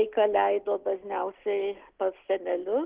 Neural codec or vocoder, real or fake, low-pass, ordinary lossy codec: none; real; 3.6 kHz; Opus, 16 kbps